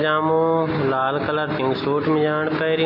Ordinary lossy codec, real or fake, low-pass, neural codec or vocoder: none; real; 5.4 kHz; none